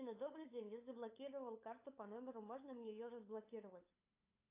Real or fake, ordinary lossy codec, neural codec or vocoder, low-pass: fake; AAC, 24 kbps; codec, 16 kHz, 8 kbps, FunCodec, trained on LibriTTS, 25 frames a second; 3.6 kHz